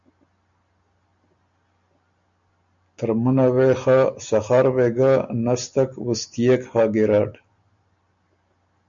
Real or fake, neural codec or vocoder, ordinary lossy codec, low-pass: real; none; MP3, 64 kbps; 7.2 kHz